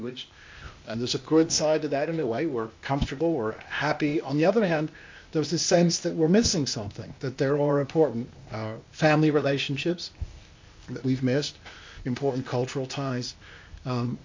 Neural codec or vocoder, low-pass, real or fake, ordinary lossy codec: codec, 16 kHz, 0.8 kbps, ZipCodec; 7.2 kHz; fake; MP3, 48 kbps